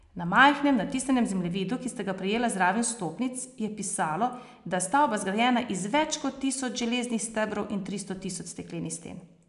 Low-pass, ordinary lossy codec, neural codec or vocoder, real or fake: 10.8 kHz; none; none; real